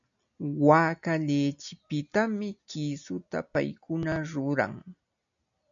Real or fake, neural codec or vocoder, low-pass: real; none; 7.2 kHz